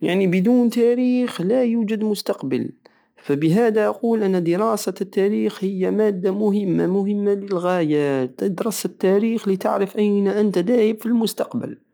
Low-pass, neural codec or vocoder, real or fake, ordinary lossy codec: none; none; real; none